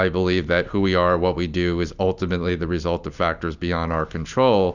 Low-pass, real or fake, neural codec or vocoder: 7.2 kHz; real; none